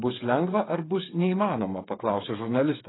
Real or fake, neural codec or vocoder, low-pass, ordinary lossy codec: fake; codec, 16 kHz, 8 kbps, FreqCodec, smaller model; 7.2 kHz; AAC, 16 kbps